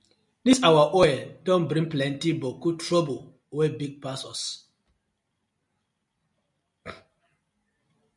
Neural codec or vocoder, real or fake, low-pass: none; real; 10.8 kHz